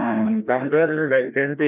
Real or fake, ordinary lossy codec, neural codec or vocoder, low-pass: fake; none; codec, 16 kHz, 1 kbps, FreqCodec, larger model; 3.6 kHz